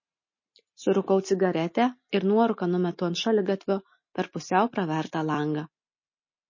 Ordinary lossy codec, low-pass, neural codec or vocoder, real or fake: MP3, 32 kbps; 7.2 kHz; none; real